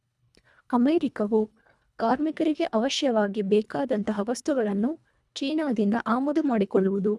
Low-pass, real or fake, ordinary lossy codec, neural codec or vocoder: none; fake; none; codec, 24 kHz, 1.5 kbps, HILCodec